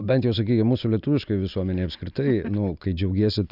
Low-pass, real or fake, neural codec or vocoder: 5.4 kHz; real; none